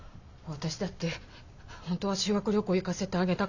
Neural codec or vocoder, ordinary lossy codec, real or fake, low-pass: none; none; real; 7.2 kHz